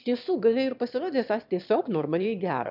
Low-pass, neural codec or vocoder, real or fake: 5.4 kHz; autoencoder, 22.05 kHz, a latent of 192 numbers a frame, VITS, trained on one speaker; fake